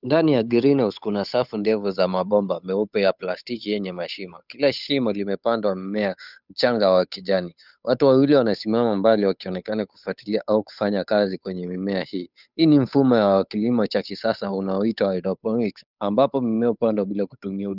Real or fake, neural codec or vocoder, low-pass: fake; codec, 16 kHz, 8 kbps, FunCodec, trained on Chinese and English, 25 frames a second; 5.4 kHz